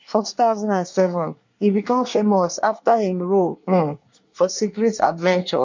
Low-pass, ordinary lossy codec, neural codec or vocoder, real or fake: 7.2 kHz; MP3, 48 kbps; codec, 24 kHz, 1 kbps, SNAC; fake